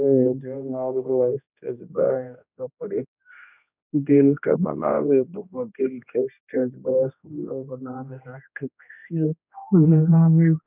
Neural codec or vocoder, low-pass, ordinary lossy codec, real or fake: codec, 16 kHz, 1 kbps, X-Codec, HuBERT features, trained on general audio; 3.6 kHz; none; fake